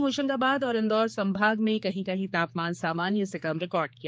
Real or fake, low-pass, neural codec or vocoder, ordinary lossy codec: fake; none; codec, 16 kHz, 4 kbps, X-Codec, HuBERT features, trained on general audio; none